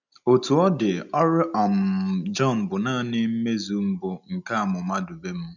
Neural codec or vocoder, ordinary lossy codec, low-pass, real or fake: none; none; 7.2 kHz; real